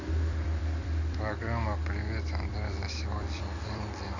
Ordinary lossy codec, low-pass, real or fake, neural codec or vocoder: none; 7.2 kHz; fake; vocoder, 44.1 kHz, 128 mel bands every 256 samples, BigVGAN v2